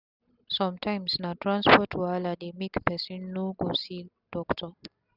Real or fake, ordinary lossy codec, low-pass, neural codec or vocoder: real; none; 5.4 kHz; none